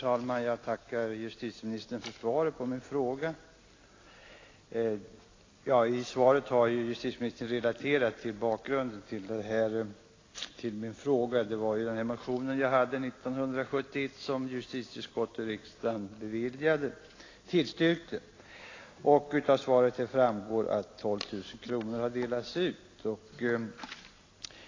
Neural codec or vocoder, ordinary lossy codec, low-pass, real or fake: none; AAC, 32 kbps; 7.2 kHz; real